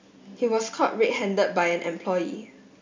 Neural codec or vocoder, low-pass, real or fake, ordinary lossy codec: none; 7.2 kHz; real; MP3, 64 kbps